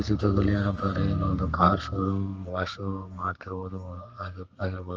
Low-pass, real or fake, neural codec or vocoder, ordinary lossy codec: 7.2 kHz; fake; codec, 32 kHz, 1.9 kbps, SNAC; Opus, 24 kbps